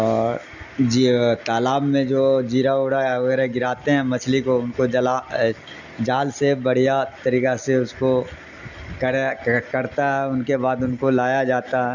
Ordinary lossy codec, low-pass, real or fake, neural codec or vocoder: none; 7.2 kHz; real; none